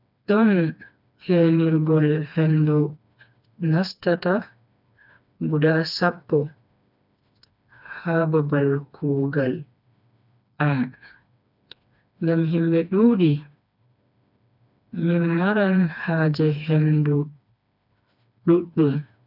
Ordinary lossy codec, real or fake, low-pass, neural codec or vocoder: none; fake; 5.4 kHz; codec, 16 kHz, 2 kbps, FreqCodec, smaller model